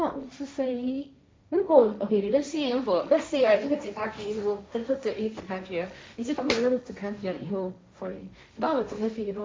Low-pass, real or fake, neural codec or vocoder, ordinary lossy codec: none; fake; codec, 16 kHz, 1.1 kbps, Voila-Tokenizer; none